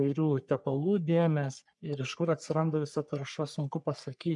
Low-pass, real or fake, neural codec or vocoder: 10.8 kHz; fake; codec, 44.1 kHz, 3.4 kbps, Pupu-Codec